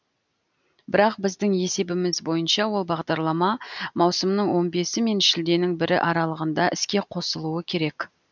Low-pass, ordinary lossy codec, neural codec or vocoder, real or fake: 7.2 kHz; none; none; real